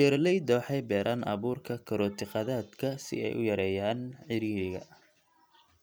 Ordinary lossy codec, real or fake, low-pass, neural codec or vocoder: none; real; none; none